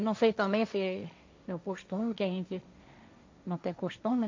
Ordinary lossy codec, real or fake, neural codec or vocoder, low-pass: MP3, 48 kbps; fake; codec, 16 kHz, 1.1 kbps, Voila-Tokenizer; 7.2 kHz